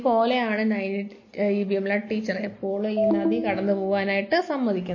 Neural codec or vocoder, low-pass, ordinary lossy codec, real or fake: none; 7.2 kHz; MP3, 32 kbps; real